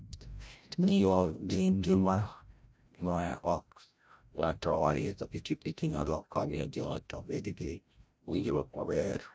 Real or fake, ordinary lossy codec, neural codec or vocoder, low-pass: fake; none; codec, 16 kHz, 0.5 kbps, FreqCodec, larger model; none